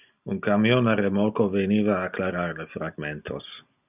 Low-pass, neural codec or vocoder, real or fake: 3.6 kHz; none; real